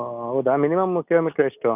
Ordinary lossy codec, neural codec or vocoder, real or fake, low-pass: none; none; real; 3.6 kHz